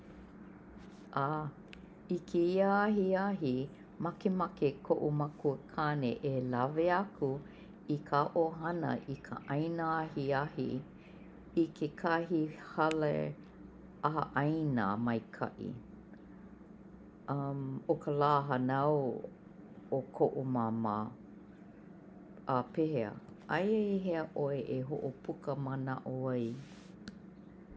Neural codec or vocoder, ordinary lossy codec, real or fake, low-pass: none; none; real; none